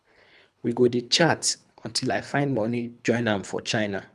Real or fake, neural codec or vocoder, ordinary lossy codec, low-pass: fake; codec, 24 kHz, 3 kbps, HILCodec; none; none